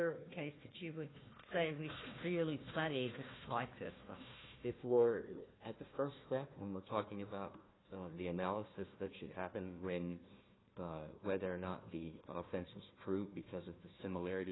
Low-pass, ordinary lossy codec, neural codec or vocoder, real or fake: 7.2 kHz; AAC, 16 kbps; codec, 16 kHz, 1 kbps, FunCodec, trained on Chinese and English, 50 frames a second; fake